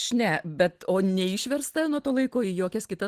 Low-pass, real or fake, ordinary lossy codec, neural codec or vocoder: 14.4 kHz; real; Opus, 16 kbps; none